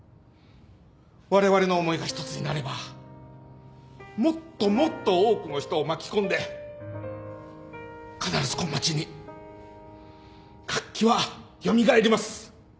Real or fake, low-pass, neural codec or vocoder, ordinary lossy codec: real; none; none; none